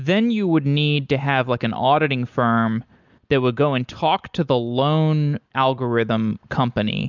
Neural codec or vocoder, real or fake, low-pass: none; real; 7.2 kHz